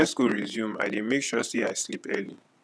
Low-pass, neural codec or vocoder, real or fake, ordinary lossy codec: none; vocoder, 22.05 kHz, 80 mel bands, WaveNeXt; fake; none